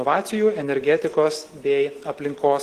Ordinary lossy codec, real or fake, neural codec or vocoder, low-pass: Opus, 16 kbps; real; none; 14.4 kHz